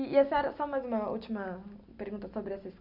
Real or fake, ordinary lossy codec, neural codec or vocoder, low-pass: real; AAC, 48 kbps; none; 5.4 kHz